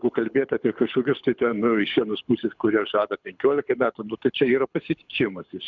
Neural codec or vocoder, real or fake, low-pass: codec, 24 kHz, 6 kbps, HILCodec; fake; 7.2 kHz